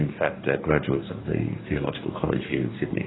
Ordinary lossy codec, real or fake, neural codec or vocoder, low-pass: AAC, 16 kbps; fake; codec, 44.1 kHz, 2.6 kbps, DAC; 7.2 kHz